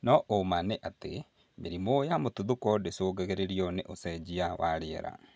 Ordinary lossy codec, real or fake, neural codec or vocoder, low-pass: none; real; none; none